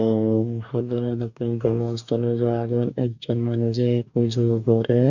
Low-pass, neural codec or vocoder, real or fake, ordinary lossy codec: 7.2 kHz; codec, 44.1 kHz, 2.6 kbps, DAC; fake; none